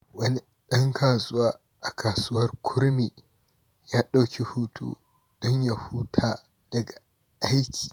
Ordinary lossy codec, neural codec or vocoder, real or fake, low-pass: none; vocoder, 48 kHz, 128 mel bands, Vocos; fake; none